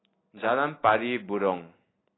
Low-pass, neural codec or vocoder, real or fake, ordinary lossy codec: 7.2 kHz; none; real; AAC, 16 kbps